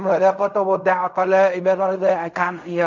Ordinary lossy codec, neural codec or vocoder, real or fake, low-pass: none; codec, 16 kHz in and 24 kHz out, 0.4 kbps, LongCat-Audio-Codec, fine tuned four codebook decoder; fake; 7.2 kHz